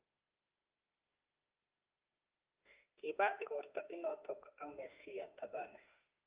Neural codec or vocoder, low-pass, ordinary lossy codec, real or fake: autoencoder, 48 kHz, 32 numbers a frame, DAC-VAE, trained on Japanese speech; 3.6 kHz; Opus, 24 kbps; fake